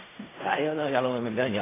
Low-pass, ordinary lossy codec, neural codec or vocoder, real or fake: 3.6 kHz; AAC, 16 kbps; codec, 16 kHz in and 24 kHz out, 0.4 kbps, LongCat-Audio-Codec, fine tuned four codebook decoder; fake